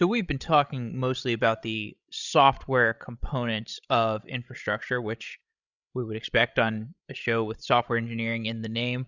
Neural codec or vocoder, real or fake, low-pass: codec, 16 kHz, 16 kbps, FreqCodec, larger model; fake; 7.2 kHz